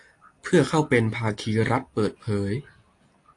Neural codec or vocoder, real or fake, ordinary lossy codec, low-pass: none; real; AAC, 48 kbps; 10.8 kHz